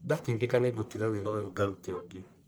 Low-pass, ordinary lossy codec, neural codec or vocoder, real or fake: none; none; codec, 44.1 kHz, 1.7 kbps, Pupu-Codec; fake